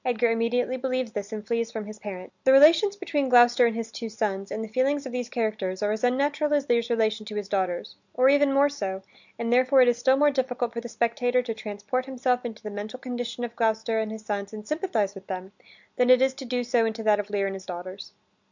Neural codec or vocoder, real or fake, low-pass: none; real; 7.2 kHz